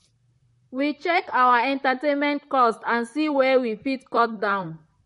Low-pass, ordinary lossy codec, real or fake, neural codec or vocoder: 19.8 kHz; MP3, 48 kbps; fake; vocoder, 44.1 kHz, 128 mel bands, Pupu-Vocoder